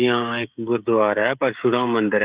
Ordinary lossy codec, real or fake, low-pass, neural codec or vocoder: Opus, 64 kbps; fake; 3.6 kHz; codec, 16 kHz, 16 kbps, FreqCodec, smaller model